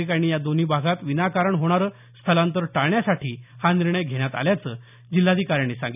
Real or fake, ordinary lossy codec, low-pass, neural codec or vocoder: real; none; 3.6 kHz; none